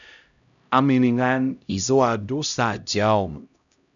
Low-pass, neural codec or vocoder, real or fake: 7.2 kHz; codec, 16 kHz, 0.5 kbps, X-Codec, HuBERT features, trained on LibriSpeech; fake